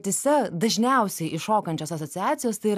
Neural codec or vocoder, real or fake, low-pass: none; real; 14.4 kHz